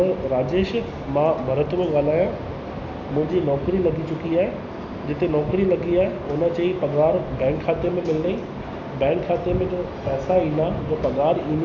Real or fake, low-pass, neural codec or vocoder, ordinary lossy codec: real; 7.2 kHz; none; none